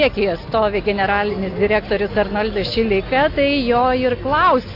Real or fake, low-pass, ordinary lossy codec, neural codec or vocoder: real; 5.4 kHz; AAC, 32 kbps; none